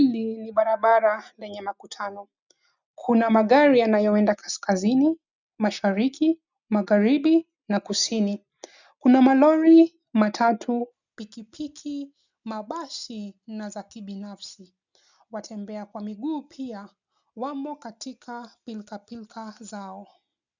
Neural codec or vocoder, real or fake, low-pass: none; real; 7.2 kHz